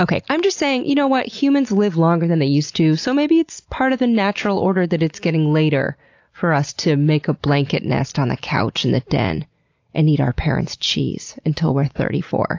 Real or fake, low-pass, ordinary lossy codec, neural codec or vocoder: real; 7.2 kHz; AAC, 48 kbps; none